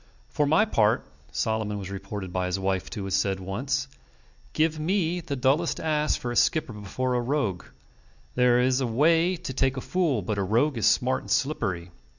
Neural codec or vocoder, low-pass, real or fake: none; 7.2 kHz; real